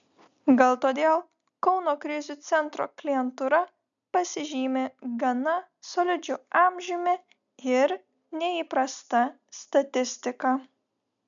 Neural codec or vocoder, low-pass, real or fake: none; 7.2 kHz; real